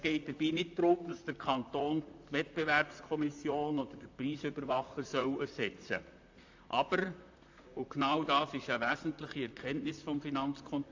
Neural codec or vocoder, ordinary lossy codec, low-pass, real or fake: vocoder, 44.1 kHz, 128 mel bands, Pupu-Vocoder; AAC, 48 kbps; 7.2 kHz; fake